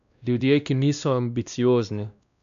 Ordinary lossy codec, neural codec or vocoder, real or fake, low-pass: none; codec, 16 kHz, 1 kbps, X-Codec, WavLM features, trained on Multilingual LibriSpeech; fake; 7.2 kHz